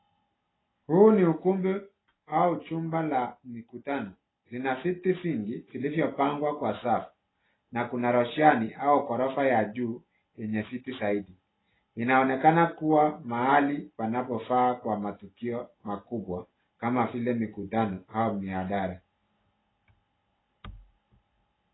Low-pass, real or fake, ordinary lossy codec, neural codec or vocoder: 7.2 kHz; real; AAC, 16 kbps; none